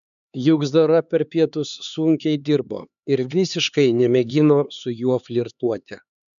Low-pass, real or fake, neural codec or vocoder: 7.2 kHz; fake; codec, 16 kHz, 4 kbps, X-Codec, HuBERT features, trained on LibriSpeech